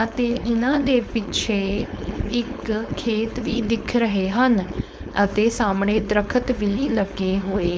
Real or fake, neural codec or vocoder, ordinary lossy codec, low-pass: fake; codec, 16 kHz, 4.8 kbps, FACodec; none; none